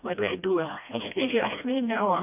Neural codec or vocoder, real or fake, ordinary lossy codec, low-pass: codec, 16 kHz, 1 kbps, FreqCodec, smaller model; fake; none; 3.6 kHz